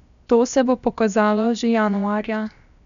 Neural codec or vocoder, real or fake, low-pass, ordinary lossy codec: codec, 16 kHz, 0.7 kbps, FocalCodec; fake; 7.2 kHz; none